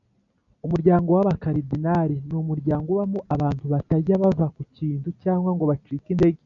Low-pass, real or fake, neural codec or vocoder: 7.2 kHz; real; none